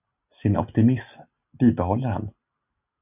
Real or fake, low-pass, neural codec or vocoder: real; 3.6 kHz; none